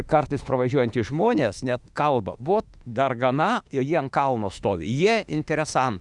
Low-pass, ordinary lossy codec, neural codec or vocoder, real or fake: 10.8 kHz; Opus, 64 kbps; autoencoder, 48 kHz, 32 numbers a frame, DAC-VAE, trained on Japanese speech; fake